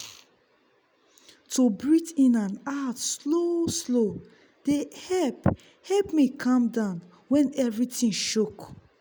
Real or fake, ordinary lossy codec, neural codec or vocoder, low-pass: real; none; none; none